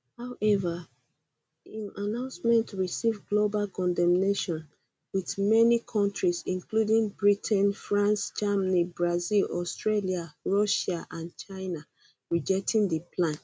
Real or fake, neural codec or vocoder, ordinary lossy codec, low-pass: real; none; none; none